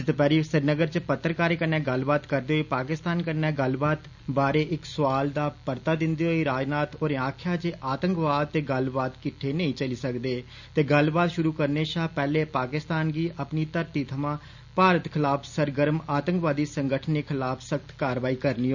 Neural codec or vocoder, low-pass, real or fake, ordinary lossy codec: none; 7.2 kHz; real; none